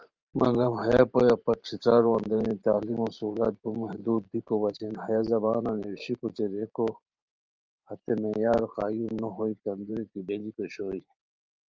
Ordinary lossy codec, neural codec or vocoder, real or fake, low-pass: Opus, 24 kbps; vocoder, 44.1 kHz, 128 mel bands every 512 samples, BigVGAN v2; fake; 7.2 kHz